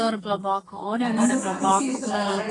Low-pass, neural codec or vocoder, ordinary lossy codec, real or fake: 10.8 kHz; codec, 32 kHz, 1.9 kbps, SNAC; AAC, 32 kbps; fake